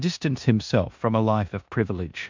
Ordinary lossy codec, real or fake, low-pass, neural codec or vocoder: MP3, 64 kbps; fake; 7.2 kHz; codec, 16 kHz in and 24 kHz out, 0.9 kbps, LongCat-Audio-Codec, four codebook decoder